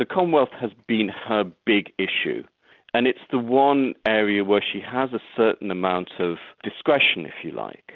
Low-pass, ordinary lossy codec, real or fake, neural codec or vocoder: 7.2 kHz; Opus, 32 kbps; real; none